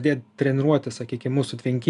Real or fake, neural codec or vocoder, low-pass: real; none; 10.8 kHz